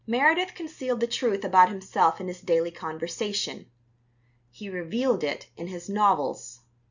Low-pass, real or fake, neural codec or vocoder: 7.2 kHz; real; none